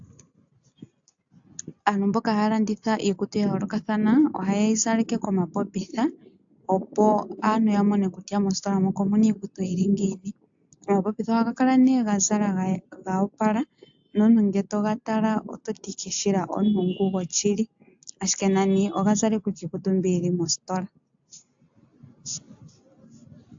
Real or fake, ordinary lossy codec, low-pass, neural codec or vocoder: real; AAC, 64 kbps; 7.2 kHz; none